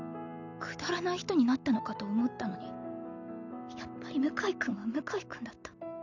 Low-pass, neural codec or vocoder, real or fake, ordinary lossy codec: 7.2 kHz; none; real; none